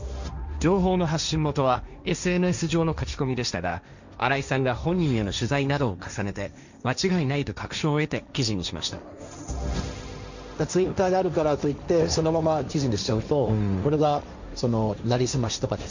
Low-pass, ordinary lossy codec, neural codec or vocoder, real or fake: 7.2 kHz; none; codec, 16 kHz, 1.1 kbps, Voila-Tokenizer; fake